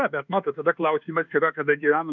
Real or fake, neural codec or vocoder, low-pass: fake; codec, 24 kHz, 1.2 kbps, DualCodec; 7.2 kHz